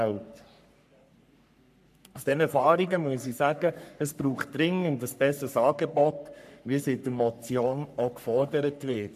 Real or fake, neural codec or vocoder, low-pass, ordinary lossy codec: fake; codec, 44.1 kHz, 3.4 kbps, Pupu-Codec; 14.4 kHz; MP3, 96 kbps